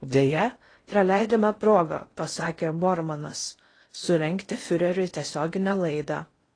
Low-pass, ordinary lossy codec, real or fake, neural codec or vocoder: 9.9 kHz; AAC, 32 kbps; fake; codec, 16 kHz in and 24 kHz out, 0.6 kbps, FocalCodec, streaming, 4096 codes